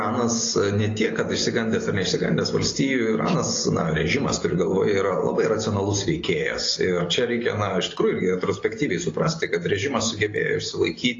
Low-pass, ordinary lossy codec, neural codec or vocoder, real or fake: 7.2 kHz; AAC, 32 kbps; none; real